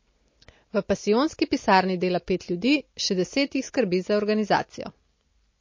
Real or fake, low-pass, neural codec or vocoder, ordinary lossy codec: real; 7.2 kHz; none; MP3, 32 kbps